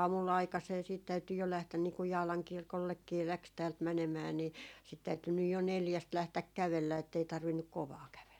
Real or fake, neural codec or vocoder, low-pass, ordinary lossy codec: real; none; 19.8 kHz; none